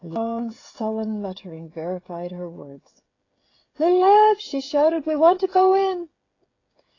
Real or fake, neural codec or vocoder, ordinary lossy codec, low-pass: fake; codec, 16 kHz, 16 kbps, FreqCodec, smaller model; AAC, 32 kbps; 7.2 kHz